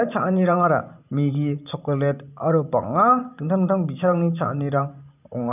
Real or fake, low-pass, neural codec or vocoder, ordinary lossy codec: fake; 3.6 kHz; vocoder, 44.1 kHz, 128 mel bands every 512 samples, BigVGAN v2; none